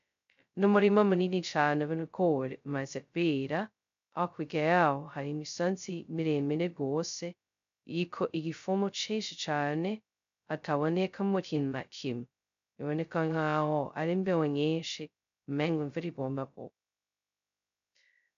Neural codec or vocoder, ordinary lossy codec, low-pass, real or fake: codec, 16 kHz, 0.2 kbps, FocalCodec; MP3, 64 kbps; 7.2 kHz; fake